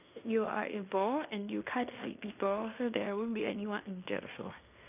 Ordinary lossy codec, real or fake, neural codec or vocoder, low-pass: none; fake; codec, 16 kHz in and 24 kHz out, 0.9 kbps, LongCat-Audio-Codec, fine tuned four codebook decoder; 3.6 kHz